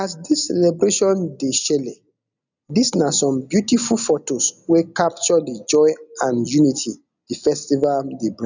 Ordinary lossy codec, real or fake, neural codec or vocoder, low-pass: none; real; none; 7.2 kHz